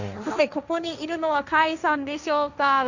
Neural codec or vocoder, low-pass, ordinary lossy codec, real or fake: codec, 16 kHz, 1.1 kbps, Voila-Tokenizer; 7.2 kHz; none; fake